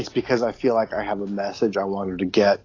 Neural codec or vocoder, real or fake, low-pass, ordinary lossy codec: none; real; 7.2 kHz; AAC, 32 kbps